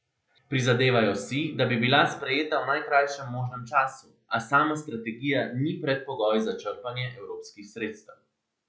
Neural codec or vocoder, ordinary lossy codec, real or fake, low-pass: none; none; real; none